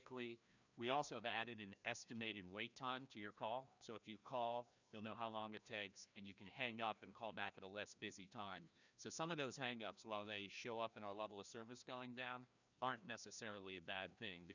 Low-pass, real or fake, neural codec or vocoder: 7.2 kHz; fake; codec, 16 kHz, 1 kbps, FreqCodec, larger model